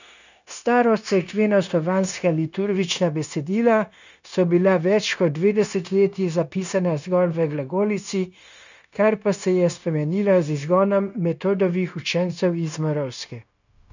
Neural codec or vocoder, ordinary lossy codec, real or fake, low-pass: codec, 16 kHz, 0.9 kbps, LongCat-Audio-Codec; none; fake; 7.2 kHz